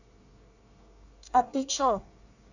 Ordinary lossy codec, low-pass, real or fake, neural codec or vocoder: none; 7.2 kHz; fake; codec, 24 kHz, 1 kbps, SNAC